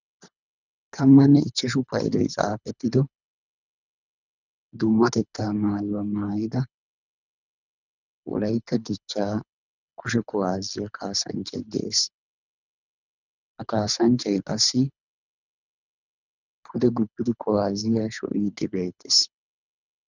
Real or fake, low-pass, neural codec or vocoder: fake; 7.2 kHz; codec, 24 kHz, 3 kbps, HILCodec